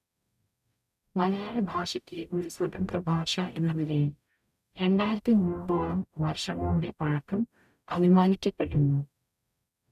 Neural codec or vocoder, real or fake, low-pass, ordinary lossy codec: codec, 44.1 kHz, 0.9 kbps, DAC; fake; 14.4 kHz; none